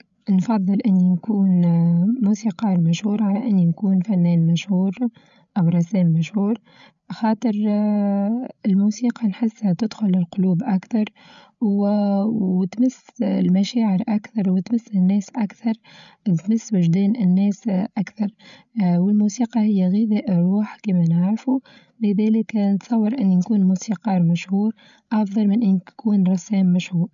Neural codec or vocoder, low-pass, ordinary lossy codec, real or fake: codec, 16 kHz, 8 kbps, FreqCodec, larger model; 7.2 kHz; none; fake